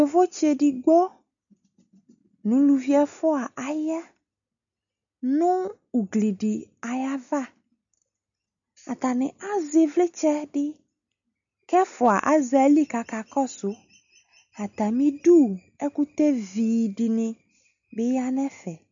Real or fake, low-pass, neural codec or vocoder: real; 7.2 kHz; none